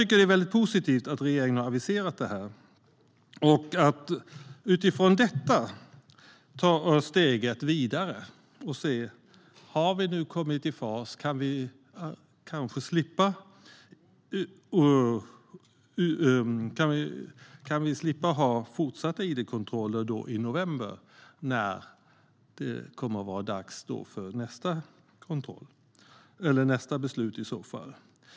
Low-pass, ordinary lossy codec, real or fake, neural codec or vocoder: none; none; real; none